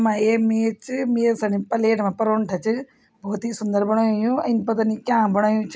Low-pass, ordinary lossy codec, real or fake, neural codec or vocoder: none; none; real; none